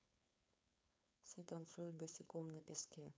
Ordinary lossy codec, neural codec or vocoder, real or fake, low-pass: none; codec, 16 kHz, 4.8 kbps, FACodec; fake; none